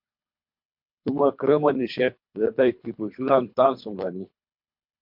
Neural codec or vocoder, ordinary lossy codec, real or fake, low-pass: codec, 24 kHz, 3 kbps, HILCodec; MP3, 48 kbps; fake; 5.4 kHz